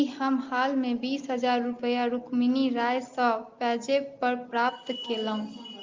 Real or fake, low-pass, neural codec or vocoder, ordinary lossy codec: real; 7.2 kHz; none; Opus, 32 kbps